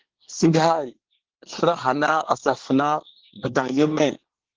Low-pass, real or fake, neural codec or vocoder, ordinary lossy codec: 7.2 kHz; fake; codec, 24 kHz, 1 kbps, SNAC; Opus, 16 kbps